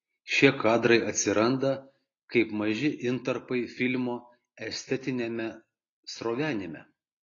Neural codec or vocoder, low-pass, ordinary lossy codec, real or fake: none; 7.2 kHz; AAC, 32 kbps; real